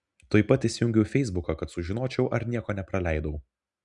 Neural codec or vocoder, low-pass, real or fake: none; 10.8 kHz; real